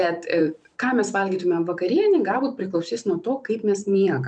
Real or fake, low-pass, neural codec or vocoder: fake; 9.9 kHz; vocoder, 44.1 kHz, 128 mel bands every 512 samples, BigVGAN v2